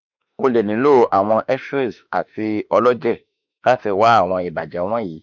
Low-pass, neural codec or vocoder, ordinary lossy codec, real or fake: 7.2 kHz; autoencoder, 48 kHz, 32 numbers a frame, DAC-VAE, trained on Japanese speech; none; fake